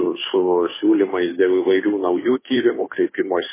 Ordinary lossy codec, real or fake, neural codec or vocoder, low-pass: MP3, 16 kbps; fake; codec, 16 kHz, 2 kbps, FunCodec, trained on Chinese and English, 25 frames a second; 3.6 kHz